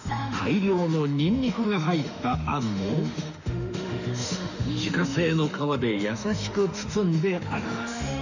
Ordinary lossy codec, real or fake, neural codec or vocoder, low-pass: none; fake; autoencoder, 48 kHz, 32 numbers a frame, DAC-VAE, trained on Japanese speech; 7.2 kHz